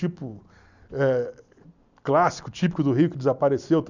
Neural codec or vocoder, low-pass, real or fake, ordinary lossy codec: none; 7.2 kHz; real; none